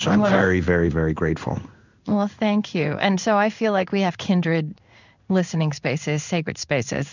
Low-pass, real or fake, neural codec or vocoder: 7.2 kHz; fake; codec, 16 kHz in and 24 kHz out, 1 kbps, XY-Tokenizer